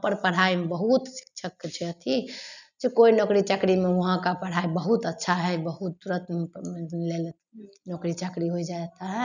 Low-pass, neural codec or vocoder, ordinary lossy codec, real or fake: 7.2 kHz; none; none; real